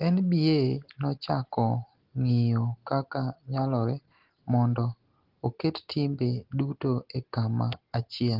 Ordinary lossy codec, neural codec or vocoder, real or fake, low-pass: Opus, 16 kbps; none; real; 5.4 kHz